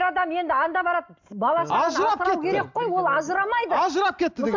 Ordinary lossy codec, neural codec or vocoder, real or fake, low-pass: MP3, 64 kbps; none; real; 7.2 kHz